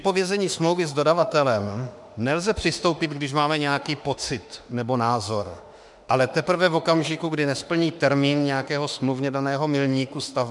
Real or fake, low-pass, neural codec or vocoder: fake; 10.8 kHz; autoencoder, 48 kHz, 32 numbers a frame, DAC-VAE, trained on Japanese speech